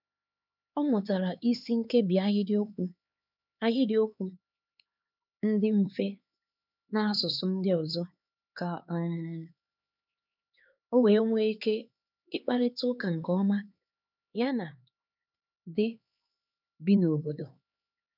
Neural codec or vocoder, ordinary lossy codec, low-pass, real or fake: codec, 16 kHz, 4 kbps, X-Codec, HuBERT features, trained on LibriSpeech; none; 5.4 kHz; fake